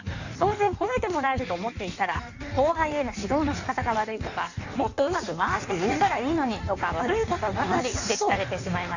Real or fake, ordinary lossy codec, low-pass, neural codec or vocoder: fake; none; 7.2 kHz; codec, 16 kHz in and 24 kHz out, 1.1 kbps, FireRedTTS-2 codec